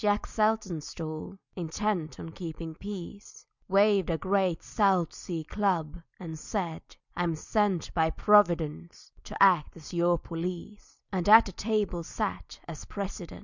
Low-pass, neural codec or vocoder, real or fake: 7.2 kHz; none; real